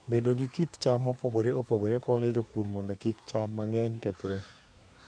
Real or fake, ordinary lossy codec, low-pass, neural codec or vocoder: fake; none; 9.9 kHz; codec, 24 kHz, 1 kbps, SNAC